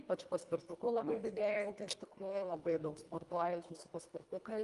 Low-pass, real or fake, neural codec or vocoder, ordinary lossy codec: 10.8 kHz; fake; codec, 24 kHz, 1.5 kbps, HILCodec; Opus, 32 kbps